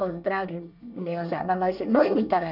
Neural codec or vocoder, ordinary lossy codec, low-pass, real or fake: codec, 24 kHz, 1 kbps, SNAC; none; 5.4 kHz; fake